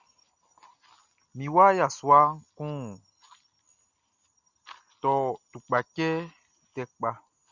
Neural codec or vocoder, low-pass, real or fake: none; 7.2 kHz; real